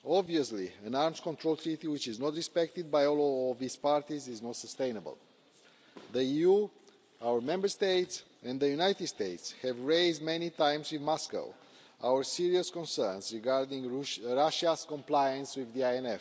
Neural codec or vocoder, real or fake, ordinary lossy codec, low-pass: none; real; none; none